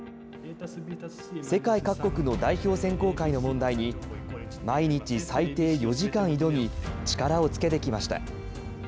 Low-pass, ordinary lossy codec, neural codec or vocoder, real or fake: none; none; none; real